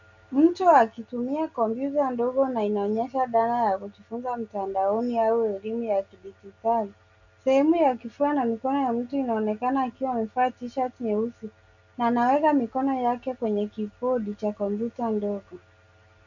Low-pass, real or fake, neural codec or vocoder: 7.2 kHz; real; none